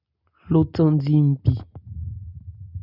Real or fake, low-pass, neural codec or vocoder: real; 5.4 kHz; none